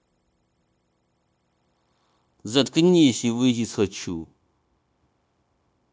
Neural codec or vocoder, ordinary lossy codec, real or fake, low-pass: codec, 16 kHz, 0.9 kbps, LongCat-Audio-Codec; none; fake; none